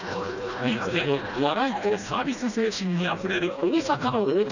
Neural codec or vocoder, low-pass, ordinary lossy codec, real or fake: codec, 16 kHz, 1 kbps, FreqCodec, smaller model; 7.2 kHz; none; fake